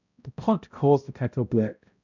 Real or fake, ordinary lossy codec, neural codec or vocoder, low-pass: fake; none; codec, 16 kHz, 0.5 kbps, X-Codec, HuBERT features, trained on balanced general audio; 7.2 kHz